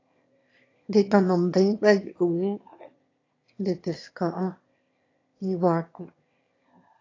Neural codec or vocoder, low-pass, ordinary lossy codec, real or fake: autoencoder, 22.05 kHz, a latent of 192 numbers a frame, VITS, trained on one speaker; 7.2 kHz; AAC, 32 kbps; fake